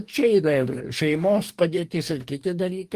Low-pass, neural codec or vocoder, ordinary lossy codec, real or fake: 14.4 kHz; codec, 44.1 kHz, 2.6 kbps, DAC; Opus, 24 kbps; fake